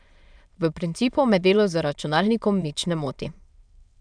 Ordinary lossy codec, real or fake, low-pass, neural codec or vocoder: none; fake; 9.9 kHz; autoencoder, 22.05 kHz, a latent of 192 numbers a frame, VITS, trained on many speakers